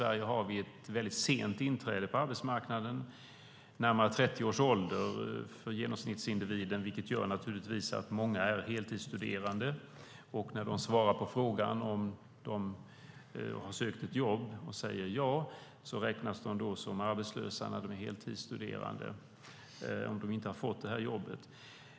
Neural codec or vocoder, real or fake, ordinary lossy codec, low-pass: none; real; none; none